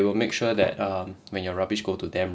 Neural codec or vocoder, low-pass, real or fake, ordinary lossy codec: none; none; real; none